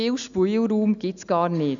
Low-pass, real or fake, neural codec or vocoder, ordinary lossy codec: 7.2 kHz; real; none; none